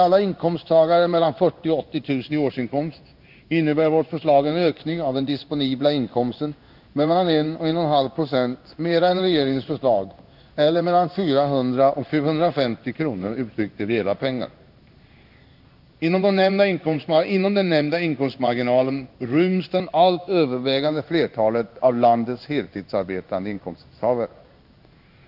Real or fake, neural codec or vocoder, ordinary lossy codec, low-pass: fake; codec, 16 kHz in and 24 kHz out, 1 kbps, XY-Tokenizer; none; 5.4 kHz